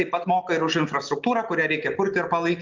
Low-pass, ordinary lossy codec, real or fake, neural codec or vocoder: 7.2 kHz; Opus, 32 kbps; real; none